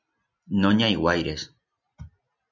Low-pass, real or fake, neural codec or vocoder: 7.2 kHz; real; none